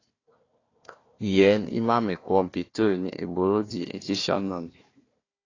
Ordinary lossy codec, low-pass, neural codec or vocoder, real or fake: AAC, 32 kbps; 7.2 kHz; codec, 16 kHz, 1 kbps, FunCodec, trained on Chinese and English, 50 frames a second; fake